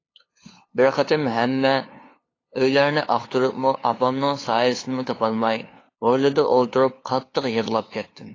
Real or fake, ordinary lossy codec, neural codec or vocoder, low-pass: fake; AAC, 32 kbps; codec, 16 kHz, 2 kbps, FunCodec, trained on LibriTTS, 25 frames a second; 7.2 kHz